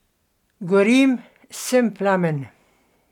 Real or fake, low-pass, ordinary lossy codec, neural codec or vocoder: real; 19.8 kHz; none; none